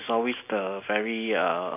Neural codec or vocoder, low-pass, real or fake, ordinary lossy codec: none; 3.6 kHz; real; AAC, 32 kbps